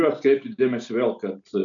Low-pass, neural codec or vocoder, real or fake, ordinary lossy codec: 7.2 kHz; none; real; MP3, 96 kbps